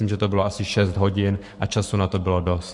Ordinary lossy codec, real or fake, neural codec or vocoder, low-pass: MP3, 64 kbps; fake; codec, 44.1 kHz, 7.8 kbps, Pupu-Codec; 10.8 kHz